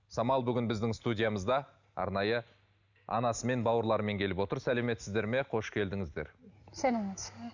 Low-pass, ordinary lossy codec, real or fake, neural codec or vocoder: 7.2 kHz; none; real; none